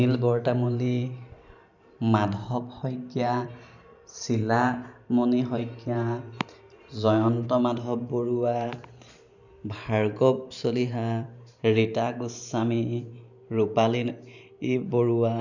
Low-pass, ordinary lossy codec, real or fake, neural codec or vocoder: 7.2 kHz; none; fake; vocoder, 44.1 kHz, 128 mel bands every 512 samples, BigVGAN v2